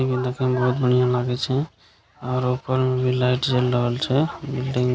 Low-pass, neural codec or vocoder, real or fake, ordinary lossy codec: none; none; real; none